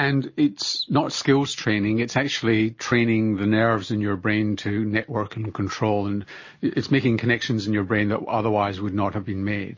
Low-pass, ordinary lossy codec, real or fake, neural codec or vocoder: 7.2 kHz; MP3, 32 kbps; real; none